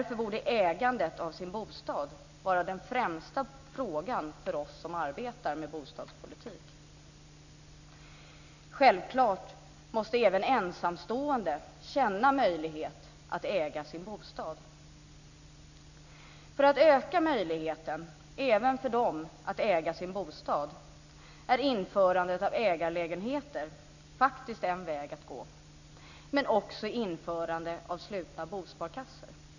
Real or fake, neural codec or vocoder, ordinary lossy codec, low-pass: real; none; none; 7.2 kHz